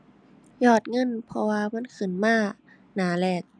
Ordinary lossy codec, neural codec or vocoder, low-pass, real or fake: none; none; 9.9 kHz; real